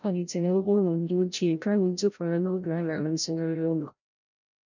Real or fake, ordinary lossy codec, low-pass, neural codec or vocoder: fake; none; 7.2 kHz; codec, 16 kHz, 0.5 kbps, FreqCodec, larger model